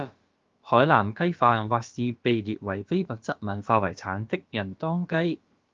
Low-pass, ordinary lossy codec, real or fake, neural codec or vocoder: 7.2 kHz; Opus, 32 kbps; fake; codec, 16 kHz, about 1 kbps, DyCAST, with the encoder's durations